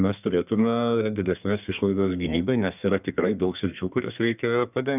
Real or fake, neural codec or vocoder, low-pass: fake; codec, 32 kHz, 1.9 kbps, SNAC; 3.6 kHz